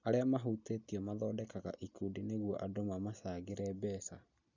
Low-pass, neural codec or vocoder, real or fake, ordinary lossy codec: 7.2 kHz; none; real; none